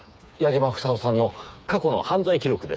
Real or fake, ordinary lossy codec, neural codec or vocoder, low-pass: fake; none; codec, 16 kHz, 4 kbps, FreqCodec, smaller model; none